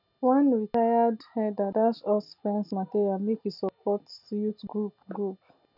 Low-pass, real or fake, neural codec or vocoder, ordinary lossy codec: 5.4 kHz; real; none; none